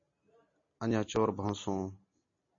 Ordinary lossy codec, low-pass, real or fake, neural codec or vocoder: MP3, 32 kbps; 7.2 kHz; real; none